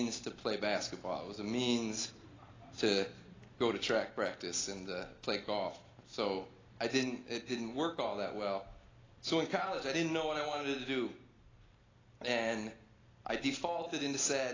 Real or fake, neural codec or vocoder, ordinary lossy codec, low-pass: real; none; AAC, 32 kbps; 7.2 kHz